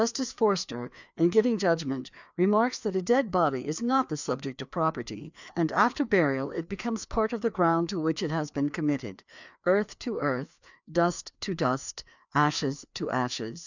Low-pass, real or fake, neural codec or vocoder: 7.2 kHz; fake; codec, 16 kHz, 2 kbps, FreqCodec, larger model